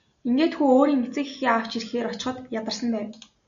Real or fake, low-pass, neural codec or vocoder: real; 7.2 kHz; none